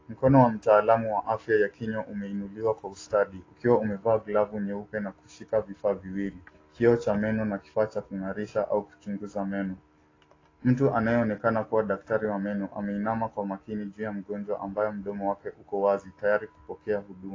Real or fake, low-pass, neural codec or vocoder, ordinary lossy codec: real; 7.2 kHz; none; AAC, 32 kbps